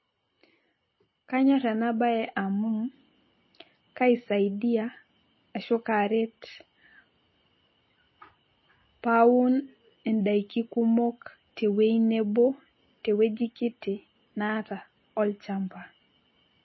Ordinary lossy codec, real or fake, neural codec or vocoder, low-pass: MP3, 24 kbps; real; none; 7.2 kHz